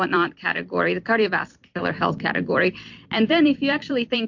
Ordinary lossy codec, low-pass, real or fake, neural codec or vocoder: MP3, 48 kbps; 7.2 kHz; fake; vocoder, 44.1 kHz, 128 mel bands every 256 samples, BigVGAN v2